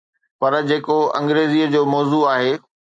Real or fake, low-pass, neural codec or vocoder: real; 9.9 kHz; none